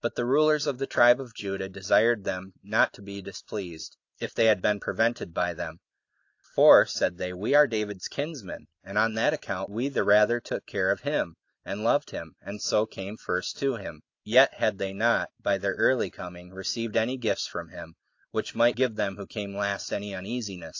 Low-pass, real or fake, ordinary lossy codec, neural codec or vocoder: 7.2 kHz; real; AAC, 48 kbps; none